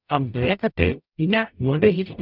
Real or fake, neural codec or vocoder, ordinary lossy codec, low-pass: fake; codec, 44.1 kHz, 0.9 kbps, DAC; none; 5.4 kHz